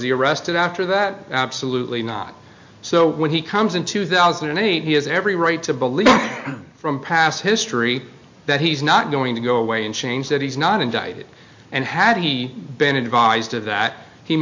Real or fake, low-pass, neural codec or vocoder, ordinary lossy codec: real; 7.2 kHz; none; MP3, 48 kbps